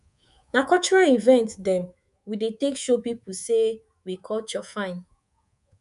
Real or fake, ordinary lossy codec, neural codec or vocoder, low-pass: fake; none; codec, 24 kHz, 3.1 kbps, DualCodec; 10.8 kHz